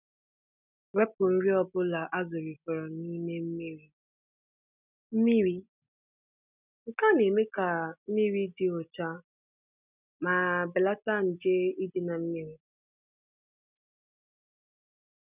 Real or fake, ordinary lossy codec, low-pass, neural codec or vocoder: real; none; 3.6 kHz; none